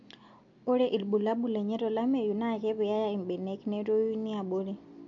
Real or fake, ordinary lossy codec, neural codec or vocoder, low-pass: real; MP3, 48 kbps; none; 7.2 kHz